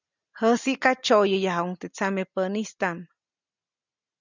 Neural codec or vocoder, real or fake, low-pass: none; real; 7.2 kHz